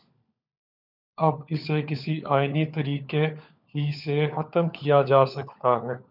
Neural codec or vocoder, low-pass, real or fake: codec, 16 kHz, 4 kbps, FunCodec, trained on LibriTTS, 50 frames a second; 5.4 kHz; fake